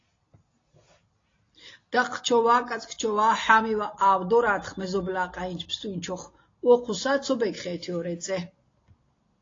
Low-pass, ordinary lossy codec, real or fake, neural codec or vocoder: 7.2 kHz; AAC, 32 kbps; real; none